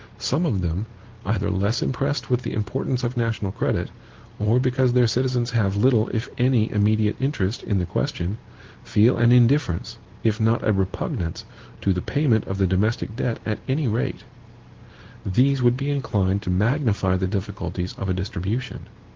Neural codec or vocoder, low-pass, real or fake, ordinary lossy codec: none; 7.2 kHz; real; Opus, 16 kbps